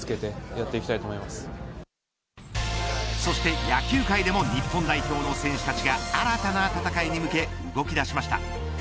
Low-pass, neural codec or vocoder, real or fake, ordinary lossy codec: none; none; real; none